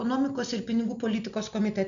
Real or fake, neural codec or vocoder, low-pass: real; none; 7.2 kHz